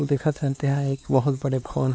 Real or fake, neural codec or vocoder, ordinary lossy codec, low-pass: fake; codec, 16 kHz, 2 kbps, X-Codec, WavLM features, trained on Multilingual LibriSpeech; none; none